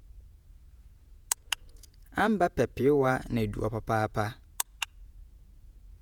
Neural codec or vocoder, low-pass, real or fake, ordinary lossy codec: vocoder, 48 kHz, 128 mel bands, Vocos; none; fake; none